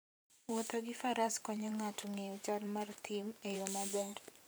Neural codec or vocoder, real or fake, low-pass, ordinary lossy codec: vocoder, 44.1 kHz, 128 mel bands every 512 samples, BigVGAN v2; fake; none; none